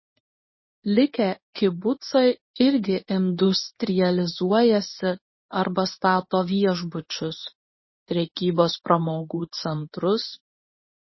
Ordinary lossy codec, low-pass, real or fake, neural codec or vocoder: MP3, 24 kbps; 7.2 kHz; fake; codec, 24 kHz, 0.9 kbps, WavTokenizer, medium speech release version 1